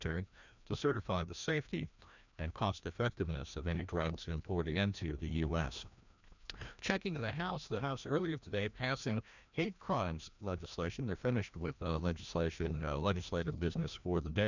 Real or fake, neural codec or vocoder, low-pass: fake; codec, 16 kHz, 1 kbps, FreqCodec, larger model; 7.2 kHz